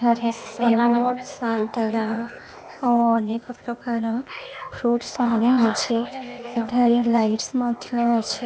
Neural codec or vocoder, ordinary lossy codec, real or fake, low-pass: codec, 16 kHz, 0.8 kbps, ZipCodec; none; fake; none